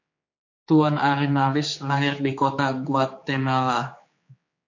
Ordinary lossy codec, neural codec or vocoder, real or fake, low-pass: MP3, 48 kbps; codec, 16 kHz, 4 kbps, X-Codec, HuBERT features, trained on general audio; fake; 7.2 kHz